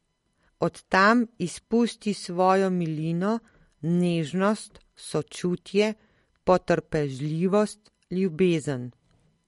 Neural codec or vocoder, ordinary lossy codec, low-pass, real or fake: none; MP3, 48 kbps; 19.8 kHz; real